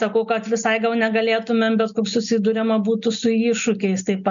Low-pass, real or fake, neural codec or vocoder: 7.2 kHz; real; none